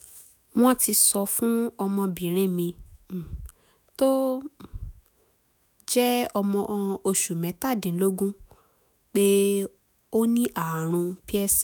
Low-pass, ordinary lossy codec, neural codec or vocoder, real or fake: none; none; autoencoder, 48 kHz, 128 numbers a frame, DAC-VAE, trained on Japanese speech; fake